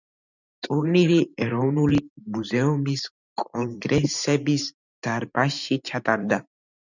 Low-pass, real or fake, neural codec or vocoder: 7.2 kHz; fake; vocoder, 44.1 kHz, 128 mel bands every 512 samples, BigVGAN v2